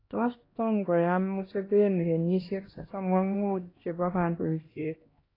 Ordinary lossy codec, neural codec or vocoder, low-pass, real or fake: AAC, 24 kbps; codec, 16 kHz, 1 kbps, X-Codec, HuBERT features, trained on LibriSpeech; 5.4 kHz; fake